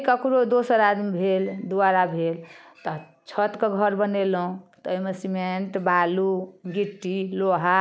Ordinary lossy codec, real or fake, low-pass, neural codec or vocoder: none; real; none; none